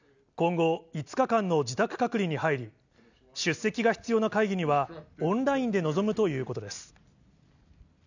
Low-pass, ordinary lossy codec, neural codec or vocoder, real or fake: 7.2 kHz; none; none; real